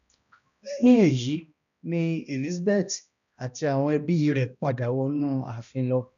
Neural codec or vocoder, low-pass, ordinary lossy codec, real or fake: codec, 16 kHz, 1 kbps, X-Codec, HuBERT features, trained on balanced general audio; 7.2 kHz; none; fake